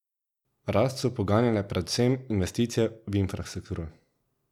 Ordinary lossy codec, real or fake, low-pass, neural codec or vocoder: none; real; 19.8 kHz; none